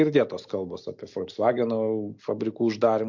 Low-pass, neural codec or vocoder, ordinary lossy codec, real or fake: 7.2 kHz; none; MP3, 48 kbps; real